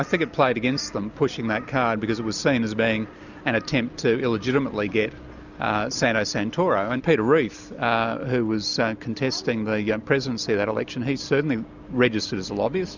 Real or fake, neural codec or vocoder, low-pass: real; none; 7.2 kHz